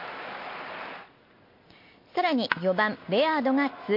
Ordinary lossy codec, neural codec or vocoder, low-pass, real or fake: MP3, 32 kbps; none; 5.4 kHz; real